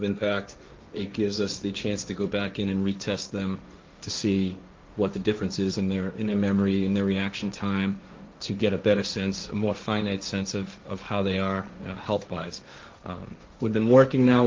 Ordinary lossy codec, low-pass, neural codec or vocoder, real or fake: Opus, 24 kbps; 7.2 kHz; codec, 16 kHz, 1.1 kbps, Voila-Tokenizer; fake